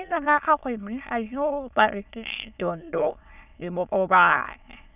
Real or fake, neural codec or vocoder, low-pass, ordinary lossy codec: fake; autoencoder, 22.05 kHz, a latent of 192 numbers a frame, VITS, trained on many speakers; 3.6 kHz; none